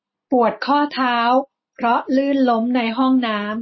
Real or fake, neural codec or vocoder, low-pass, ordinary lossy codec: real; none; 7.2 kHz; MP3, 24 kbps